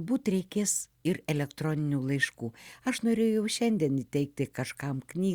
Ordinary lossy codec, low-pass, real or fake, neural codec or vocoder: Opus, 64 kbps; 19.8 kHz; real; none